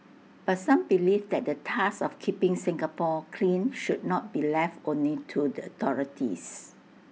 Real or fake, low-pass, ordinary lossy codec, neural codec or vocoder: real; none; none; none